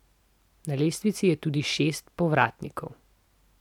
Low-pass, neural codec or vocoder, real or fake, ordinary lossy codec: 19.8 kHz; none; real; none